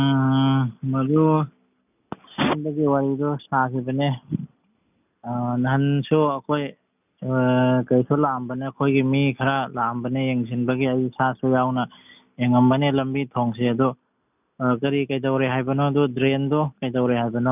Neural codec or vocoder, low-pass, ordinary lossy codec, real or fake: none; 3.6 kHz; none; real